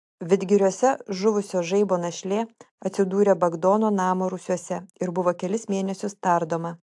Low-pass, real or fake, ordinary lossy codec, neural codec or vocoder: 10.8 kHz; real; AAC, 64 kbps; none